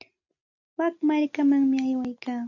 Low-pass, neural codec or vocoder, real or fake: 7.2 kHz; none; real